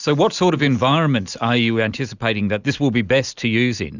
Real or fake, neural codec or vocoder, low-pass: real; none; 7.2 kHz